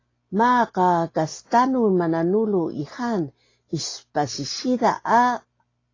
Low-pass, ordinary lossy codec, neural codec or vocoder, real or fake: 7.2 kHz; AAC, 32 kbps; none; real